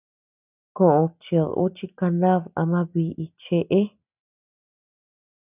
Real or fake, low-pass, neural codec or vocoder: real; 3.6 kHz; none